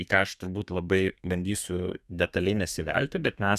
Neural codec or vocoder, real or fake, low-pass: codec, 32 kHz, 1.9 kbps, SNAC; fake; 14.4 kHz